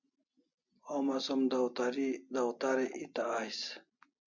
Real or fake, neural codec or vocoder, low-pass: real; none; 7.2 kHz